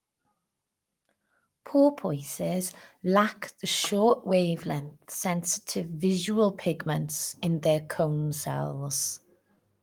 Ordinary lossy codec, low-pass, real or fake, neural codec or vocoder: Opus, 32 kbps; 19.8 kHz; fake; codec, 44.1 kHz, 7.8 kbps, Pupu-Codec